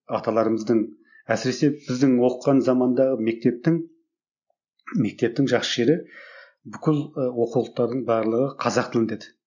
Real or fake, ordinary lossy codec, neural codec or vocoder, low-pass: real; none; none; 7.2 kHz